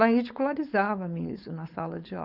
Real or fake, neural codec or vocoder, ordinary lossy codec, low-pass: fake; codec, 16 kHz, 4.8 kbps, FACodec; none; 5.4 kHz